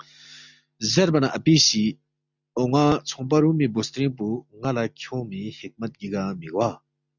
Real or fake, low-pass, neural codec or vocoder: real; 7.2 kHz; none